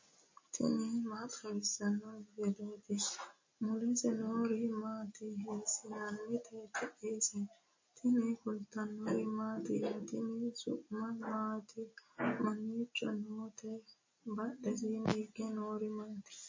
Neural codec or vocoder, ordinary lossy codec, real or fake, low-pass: none; MP3, 32 kbps; real; 7.2 kHz